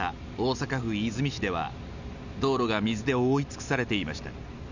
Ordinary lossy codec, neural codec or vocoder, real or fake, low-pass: none; none; real; 7.2 kHz